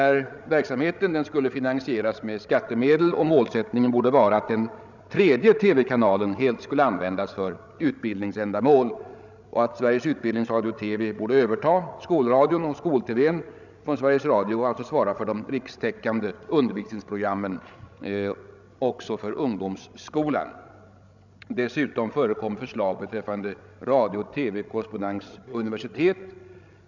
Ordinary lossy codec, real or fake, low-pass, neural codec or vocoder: none; fake; 7.2 kHz; codec, 16 kHz, 16 kbps, FreqCodec, larger model